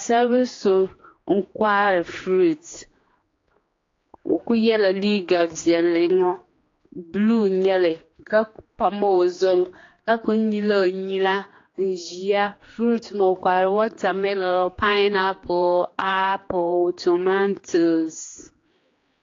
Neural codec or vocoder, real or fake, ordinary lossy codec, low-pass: codec, 16 kHz, 2 kbps, X-Codec, HuBERT features, trained on general audio; fake; AAC, 32 kbps; 7.2 kHz